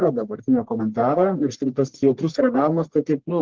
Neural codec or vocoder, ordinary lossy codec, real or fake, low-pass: codec, 44.1 kHz, 1.7 kbps, Pupu-Codec; Opus, 16 kbps; fake; 7.2 kHz